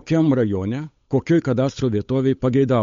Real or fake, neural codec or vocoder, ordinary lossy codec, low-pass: fake; codec, 16 kHz, 8 kbps, FunCodec, trained on Chinese and English, 25 frames a second; MP3, 48 kbps; 7.2 kHz